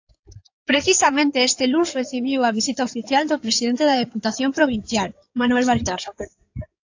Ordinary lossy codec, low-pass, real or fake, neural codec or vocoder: AAC, 48 kbps; 7.2 kHz; fake; codec, 16 kHz in and 24 kHz out, 2.2 kbps, FireRedTTS-2 codec